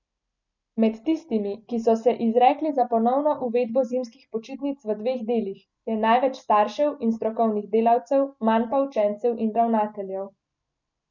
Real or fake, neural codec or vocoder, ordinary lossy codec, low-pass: real; none; none; 7.2 kHz